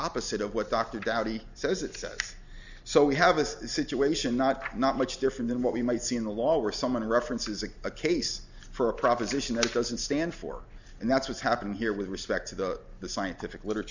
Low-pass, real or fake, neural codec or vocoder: 7.2 kHz; real; none